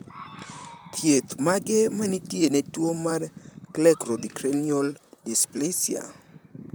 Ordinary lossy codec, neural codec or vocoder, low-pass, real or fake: none; vocoder, 44.1 kHz, 128 mel bands, Pupu-Vocoder; none; fake